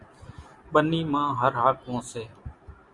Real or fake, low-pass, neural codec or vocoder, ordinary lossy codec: real; 10.8 kHz; none; Opus, 64 kbps